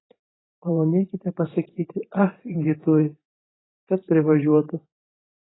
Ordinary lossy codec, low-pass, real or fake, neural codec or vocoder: AAC, 16 kbps; 7.2 kHz; fake; vocoder, 44.1 kHz, 128 mel bands every 512 samples, BigVGAN v2